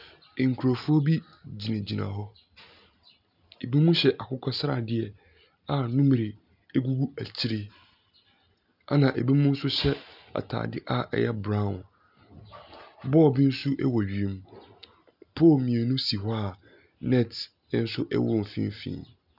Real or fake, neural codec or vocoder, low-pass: real; none; 5.4 kHz